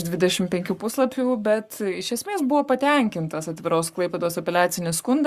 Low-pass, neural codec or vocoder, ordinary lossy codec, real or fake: 14.4 kHz; codec, 44.1 kHz, 7.8 kbps, Pupu-Codec; Opus, 64 kbps; fake